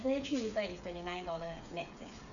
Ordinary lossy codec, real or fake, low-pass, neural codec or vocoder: none; fake; 7.2 kHz; codec, 16 kHz, 8 kbps, FreqCodec, smaller model